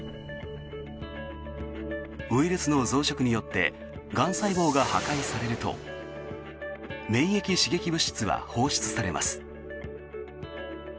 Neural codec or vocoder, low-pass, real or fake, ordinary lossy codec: none; none; real; none